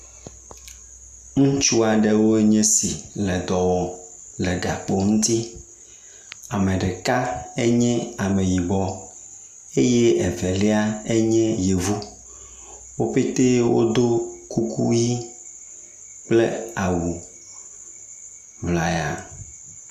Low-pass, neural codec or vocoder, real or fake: 14.4 kHz; none; real